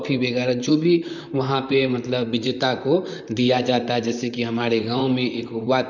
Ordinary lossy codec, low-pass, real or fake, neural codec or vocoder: none; 7.2 kHz; fake; vocoder, 44.1 kHz, 128 mel bands, Pupu-Vocoder